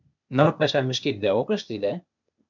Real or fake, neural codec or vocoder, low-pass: fake; codec, 16 kHz, 0.8 kbps, ZipCodec; 7.2 kHz